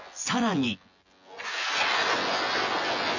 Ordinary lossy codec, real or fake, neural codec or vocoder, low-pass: none; fake; vocoder, 24 kHz, 100 mel bands, Vocos; 7.2 kHz